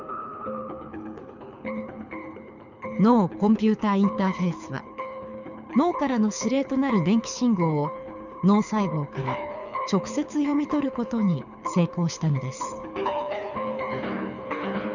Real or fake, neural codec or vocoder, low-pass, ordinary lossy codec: fake; codec, 24 kHz, 6 kbps, HILCodec; 7.2 kHz; none